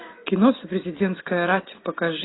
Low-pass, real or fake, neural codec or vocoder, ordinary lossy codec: 7.2 kHz; real; none; AAC, 16 kbps